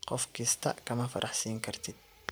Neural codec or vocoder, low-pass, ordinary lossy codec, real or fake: vocoder, 44.1 kHz, 128 mel bands every 512 samples, BigVGAN v2; none; none; fake